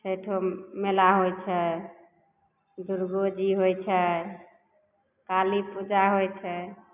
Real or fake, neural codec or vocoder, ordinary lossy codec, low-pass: real; none; none; 3.6 kHz